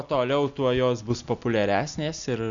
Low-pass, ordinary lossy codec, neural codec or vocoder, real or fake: 7.2 kHz; Opus, 64 kbps; none; real